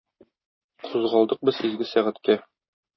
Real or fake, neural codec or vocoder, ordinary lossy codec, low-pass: real; none; MP3, 24 kbps; 7.2 kHz